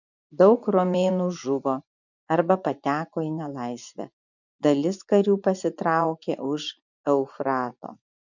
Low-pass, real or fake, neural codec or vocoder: 7.2 kHz; fake; vocoder, 24 kHz, 100 mel bands, Vocos